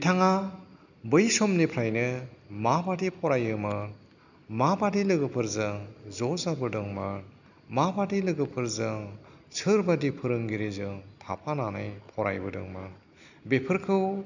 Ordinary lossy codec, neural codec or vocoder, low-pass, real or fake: none; none; 7.2 kHz; real